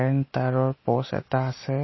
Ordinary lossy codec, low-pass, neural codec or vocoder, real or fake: MP3, 24 kbps; 7.2 kHz; none; real